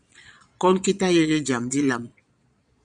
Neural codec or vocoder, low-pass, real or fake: vocoder, 22.05 kHz, 80 mel bands, Vocos; 9.9 kHz; fake